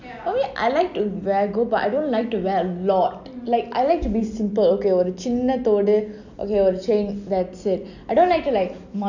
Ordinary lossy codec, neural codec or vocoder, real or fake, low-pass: none; none; real; 7.2 kHz